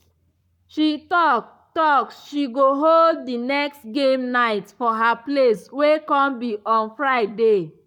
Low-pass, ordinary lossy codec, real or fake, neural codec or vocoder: 19.8 kHz; none; fake; codec, 44.1 kHz, 7.8 kbps, Pupu-Codec